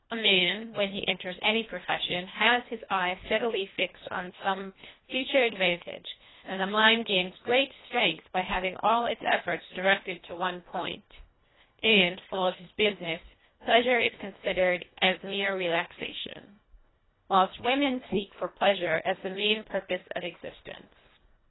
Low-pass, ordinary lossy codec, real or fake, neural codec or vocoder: 7.2 kHz; AAC, 16 kbps; fake; codec, 24 kHz, 1.5 kbps, HILCodec